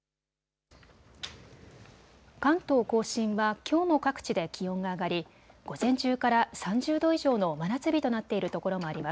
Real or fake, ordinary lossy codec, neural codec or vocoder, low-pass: real; none; none; none